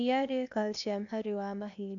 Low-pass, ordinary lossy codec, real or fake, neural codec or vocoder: 7.2 kHz; none; fake; codec, 16 kHz, 0.8 kbps, ZipCodec